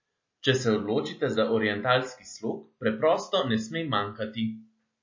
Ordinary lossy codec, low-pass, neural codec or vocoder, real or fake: MP3, 32 kbps; 7.2 kHz; none; real